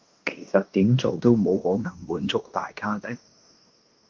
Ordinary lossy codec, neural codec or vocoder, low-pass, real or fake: Opus, 24 kbps; codec, 16 kHz in and 24 kHz out, 0.9 kbps, LongCat-Audio-Codec, fine tuned four codebook decoder; 7.2 kHz; fake